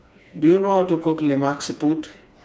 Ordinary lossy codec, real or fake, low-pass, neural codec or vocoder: none; fake; none; codec, 16 kHz, 2 kbps, FreqCodec, smaller model